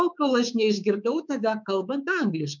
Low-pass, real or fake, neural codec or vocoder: 7.2 kHz; fake; codec, 24 kHz, 3.1 kbps, DualCodec